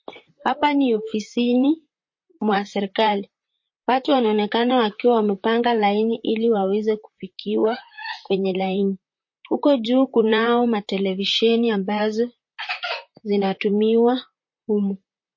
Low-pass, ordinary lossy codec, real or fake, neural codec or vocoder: 7.2 kHz; MP3, 32 kbps; fake; vocoder, 44.1 kHz, 128 mel bands, Pupu-Vocoder